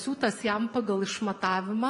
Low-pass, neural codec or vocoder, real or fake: 10.8 kHz; vocoder, 24 kHz, 100 mel bands, Vocos; fake